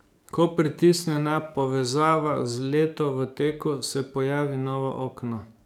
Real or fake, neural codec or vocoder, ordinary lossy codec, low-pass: fake; codec, 44.1 kHz, 7.8 kbps, DAC; none; 19.8 kHz